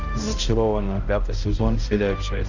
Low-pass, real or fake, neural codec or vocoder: 7.2 kHz; fake; codec, 16 kHz, 0.5 kbps, X-Codec, HuBERT features, trained on balanced general audio